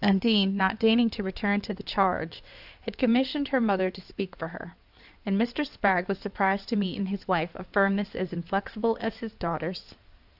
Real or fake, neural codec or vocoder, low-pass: fake; codec, 16 kHz in and 24 kHz out, 2.2 kbps, FireRedTTS-2 codec; 5.4 kHz